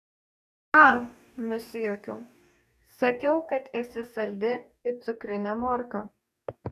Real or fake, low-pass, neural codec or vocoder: fake; 14.4 kHz; codec, 44.1 kHz, 2.6 kbps, DAC